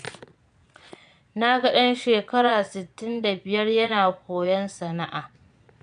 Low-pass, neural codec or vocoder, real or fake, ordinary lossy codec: 9.9 kHz; vocoder, 22.05 kHz, 80 mel bands, Vocos; fake; none